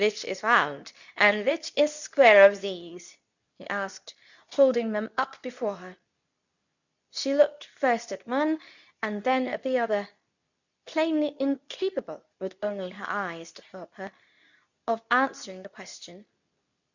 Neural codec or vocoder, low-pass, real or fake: codec, 24 kHz, 0.9 kbps, WavTokenizer, medium speech release version 2; 7.2 kHz; fake